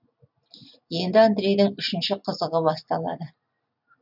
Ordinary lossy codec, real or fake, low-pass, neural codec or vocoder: none; real; 5.4 kHz; none